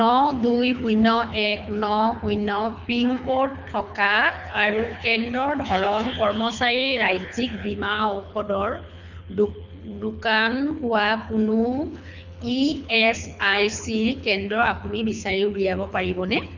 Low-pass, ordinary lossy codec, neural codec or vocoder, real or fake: 7.2 kHz; none; codec, 24 kHz, 3 kbps, HILCodec; fake